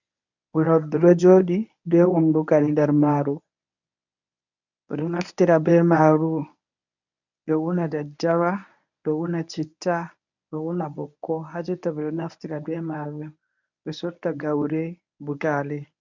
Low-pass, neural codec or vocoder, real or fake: 7.2 kHz; codec, 24 kHz, 0.9 kbps, WavTokenizer, medium speech release version 1; fake